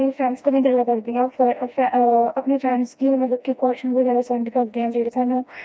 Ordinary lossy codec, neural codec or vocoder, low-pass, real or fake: none; codec, 16 kHz, 1 kbps, FreqCodec, smaller model; none; fake